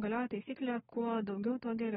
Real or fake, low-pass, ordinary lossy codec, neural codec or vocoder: fake; 19.8 kHz; AAC, 16 kbps; codec, 44.1 kHz, 7.8 kbps, DAC